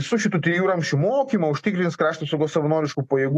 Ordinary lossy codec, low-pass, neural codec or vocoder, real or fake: AAC, 64 kbps; 14.4 kHz; none; real